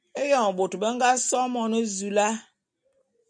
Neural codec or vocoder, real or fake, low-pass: none; real; 9.9 kHz